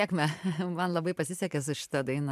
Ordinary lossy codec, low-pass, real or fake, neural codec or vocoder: MP3, 96 kbps; 14.4 kHz; fake; vocoder, 44.1 kHz, 128 mel bands every 512 samples, BigVGAN v2